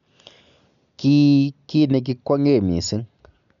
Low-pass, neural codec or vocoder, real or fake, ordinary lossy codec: 7.2 kHz; none; real; none